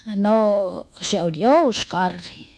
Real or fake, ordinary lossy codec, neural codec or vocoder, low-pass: fake; none; codec, 24 kHz, 1.2 kbps, DualCodec; none